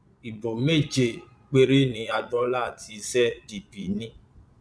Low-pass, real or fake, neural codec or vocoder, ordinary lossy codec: none; fake; vocoder, 22.05 kHz, 80 mel bands, Vocos; none